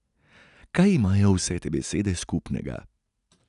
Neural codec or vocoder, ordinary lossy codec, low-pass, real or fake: none; none; 10.8 kHz; real